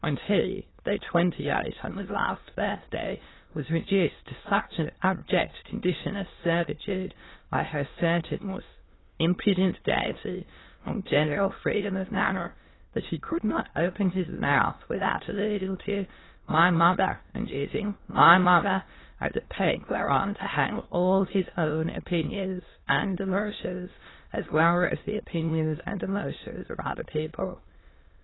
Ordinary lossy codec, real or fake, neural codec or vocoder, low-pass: AAC, 16 kbps; fake; autoencoder, 22.05 kHz, a latent of 192 numbers a frame, VITS, trained on many speakers; 7.2 kHz